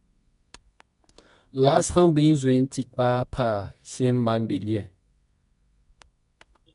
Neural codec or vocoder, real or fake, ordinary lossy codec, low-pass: codec, 24 kHz, 0.9 kbps, WavTokenizer, medium music audio release; fake; MP3, 64 kbps; 10.8 kHz